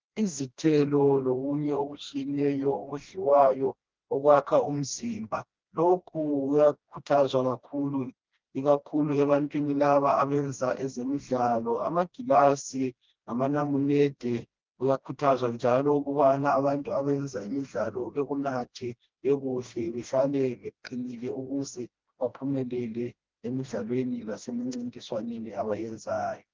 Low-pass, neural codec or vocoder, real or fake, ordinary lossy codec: 7.2 kHz; codec, 16 kHz, 1 kbps, FreqCodec, smaller model; fake; Opus, 32 kbps